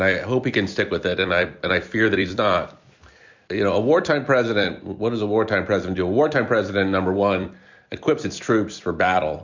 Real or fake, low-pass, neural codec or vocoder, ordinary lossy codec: fake; 7.2 kHz; vocoder, 44.1 kHz, 128 mel bands every 256 samples, BigVGAN v2; MP3, 64 kbps